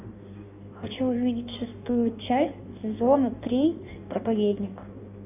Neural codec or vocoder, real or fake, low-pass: codec, 16 kHz in and 24 kHz out, 1.1 kbps, FireRedTTS-2 codec; fake; 3.6 kHz